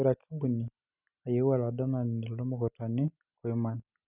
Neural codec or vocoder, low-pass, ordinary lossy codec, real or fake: none; 3.6 kHz; none; real